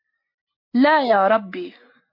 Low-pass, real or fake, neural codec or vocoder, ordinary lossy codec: 5.4 kHz; real; none; MP3, 32 kbps